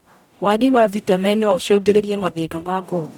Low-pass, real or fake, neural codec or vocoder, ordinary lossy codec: 19.8 kHz; fake; codec, 44.1 kHz, 0.9 kbps, DAC; none